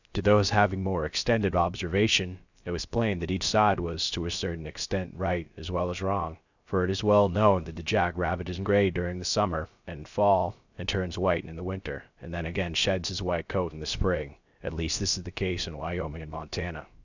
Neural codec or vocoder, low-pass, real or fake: codec, 16 kHz, 0.3 kbps, FocalCodec; 7.2 kHz; fake